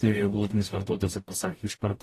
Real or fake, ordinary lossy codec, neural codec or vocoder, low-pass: fake; AAC, 48 kbps; codec, 44.1 kHz, 0.9 kbps, DAC; 14.4 kHz